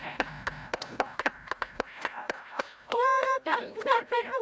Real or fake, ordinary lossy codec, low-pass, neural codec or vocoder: fake; none; none; codec, 16 kHz, 0.5 kbps, FreqCodec, larger model